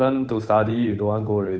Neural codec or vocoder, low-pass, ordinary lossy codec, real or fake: codec, 16 kHz, 8 kbps, FunCodec, trained on Chinese and English, 25 frames a second; none; none; fake